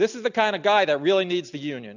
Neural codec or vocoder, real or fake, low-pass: none; real; 7.2 kHz